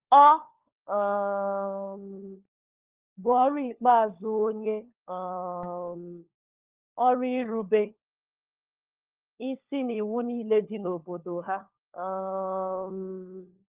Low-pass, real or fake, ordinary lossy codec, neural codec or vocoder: 3.6 kHz; fake; Opus, 24 kbps; codec, 16 kHz, 4 kbps, FunCodec, trained on LibriTTS, 50 frames a second